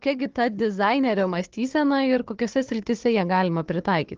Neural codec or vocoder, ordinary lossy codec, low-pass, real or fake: codec, 16 kHz, 4 kbps, FunCodec, trained on Chinese and English, 50 frames a second; Opus, 16 kbps; 7.2 kHz; fake